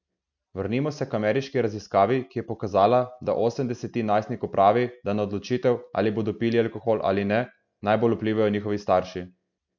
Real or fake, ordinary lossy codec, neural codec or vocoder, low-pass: real; none; none; 7.2 kHz